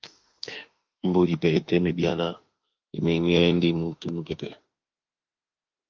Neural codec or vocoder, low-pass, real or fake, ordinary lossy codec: autoencoder, 48 kHz, 32 numbers a frame, DAC-VAE, trained on Japanese speech; 7.2 kHz; fake; Opus, 24 kbps